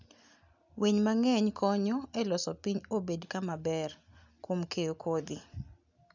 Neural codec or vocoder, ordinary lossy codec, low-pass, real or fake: none; none; 7.2 kHz; real